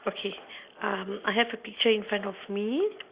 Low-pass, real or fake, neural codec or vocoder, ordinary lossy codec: 3.6 kHz; real; none; Opus, 64 kbps